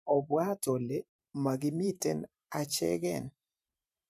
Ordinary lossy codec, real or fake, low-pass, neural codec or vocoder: MP3, 96 kbps; fake; 14.4 kHz; vocoder, 44.1 kHz, 128 mel bands every 512 samples, BigVGAN v2